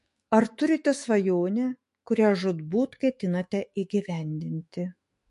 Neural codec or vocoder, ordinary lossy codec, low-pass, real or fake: autoencoder, 48 kHz, 128 numbers a frame, DAC-VAE, trained on Japanese speech; MP3, 48 kbps; 14.4 kHz; fake